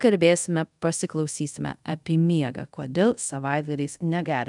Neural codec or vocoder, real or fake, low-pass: codec, 24 kHz, 0.5 kbps, DualCodec; fake; 10.8 kHz